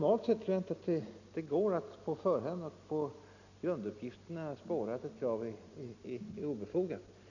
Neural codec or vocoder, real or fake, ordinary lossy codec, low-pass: none; real; none; 7.2 kHz